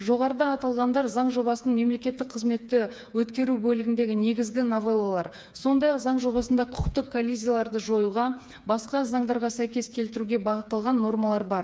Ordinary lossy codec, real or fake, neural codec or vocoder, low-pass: none; fake; codec, 16 kHz, 4 kbps, FreqCodec, smaller model; none